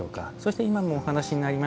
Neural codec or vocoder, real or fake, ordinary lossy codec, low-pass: none; real; none; none